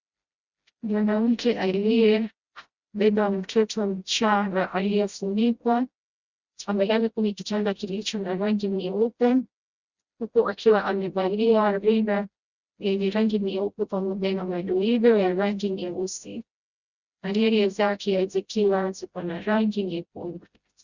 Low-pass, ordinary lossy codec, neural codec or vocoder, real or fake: 7.2 kHz; Opus, 64 kbps; codec, 16 kHz, 0.5 kbps, FreqCodec, smaller model; fake